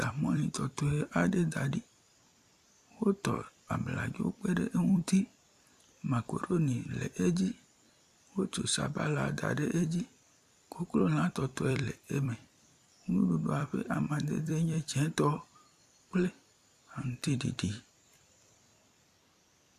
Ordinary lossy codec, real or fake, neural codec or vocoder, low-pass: AAC, 96 kbps; fake; vocoder, 44.1 kHz, 128 mel bands every 256 samples, BigVGAN v2; 14.4 kHz